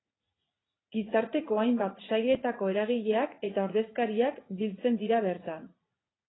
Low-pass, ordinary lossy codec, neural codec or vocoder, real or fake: 7.2 kHz; AAC, 16 kbps; vocoder, 44.1 kHz, 80 mel bands, Vocos; fake